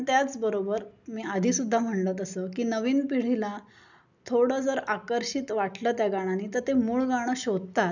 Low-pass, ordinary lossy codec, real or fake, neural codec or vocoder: 7.2 kHz; none; real; none